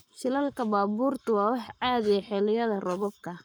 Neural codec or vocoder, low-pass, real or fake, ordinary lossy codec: codec, 44.1 kHz, 7.8 kbps, Pupu-Codec; none; fake; none